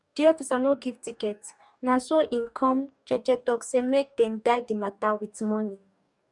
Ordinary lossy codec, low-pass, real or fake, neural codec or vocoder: none; 10.8 kHz; fake; codec, 44.1 kHz, 2.6 kbps, DAC